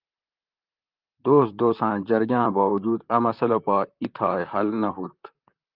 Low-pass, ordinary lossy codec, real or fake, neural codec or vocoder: 5.4 kHz; Opus, 24 kbps; fake; vocoder, 44.1 kHz, 128 mel bands, Pupu-Vocoder